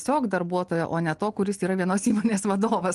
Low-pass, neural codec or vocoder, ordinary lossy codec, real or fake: 10.8 kHz; none; Opus, 24 kbps; real